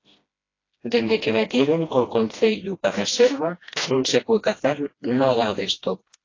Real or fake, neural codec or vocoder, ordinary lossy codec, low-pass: fake; codec, 16 kHz, 1 kbps, FreqCodec, smaller model; AAC, 32 kbps; 7.2 kHz